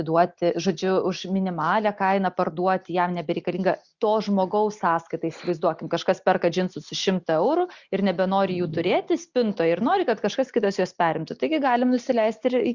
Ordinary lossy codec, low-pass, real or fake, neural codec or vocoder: Opus, 64 kbps; 7.2 kHz; real; none